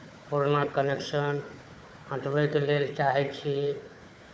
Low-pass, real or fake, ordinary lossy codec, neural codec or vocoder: none; fake; none; codec, 16 kHz, 4 kbps, FunCodec, trained on Chinese and English, 50 frames a second